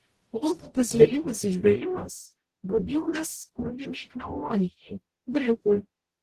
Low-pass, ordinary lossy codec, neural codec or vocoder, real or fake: 14.4 kHz; Opus, 16 kbps; codec, 44.1 kHz, 0.9 kbps, DAC; fake